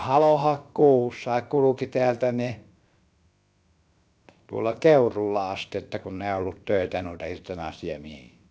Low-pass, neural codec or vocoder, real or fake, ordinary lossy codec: none; codec, 16 kHz, about 1 kbps, DyCAST, with the encoder's durations; fake; none